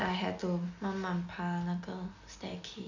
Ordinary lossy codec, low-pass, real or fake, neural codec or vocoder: none; 7.2 kHz; real; none